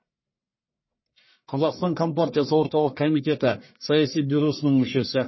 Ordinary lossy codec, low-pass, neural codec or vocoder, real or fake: MP3, 24 kbps; 7.2 kHz; codec, 44.1 kHz, 1.7 kbps, Pupu-Codec; fake